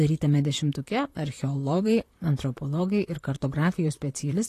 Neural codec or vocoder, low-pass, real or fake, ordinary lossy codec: vocoder, 44.1 kHz, 128 mel bands, Pupu-Vocoder; 14.4 kHz; fake; AAC, 48 kbps